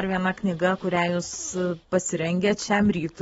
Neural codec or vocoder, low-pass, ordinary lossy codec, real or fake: none; 19.8 kHz; AAC, 24 kbps; real